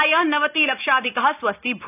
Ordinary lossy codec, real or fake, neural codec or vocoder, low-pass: none; real; none; 3.6 kHz